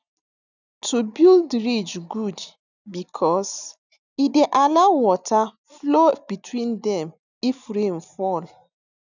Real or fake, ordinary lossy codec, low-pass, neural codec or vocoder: real; none; 7.2 kHz; none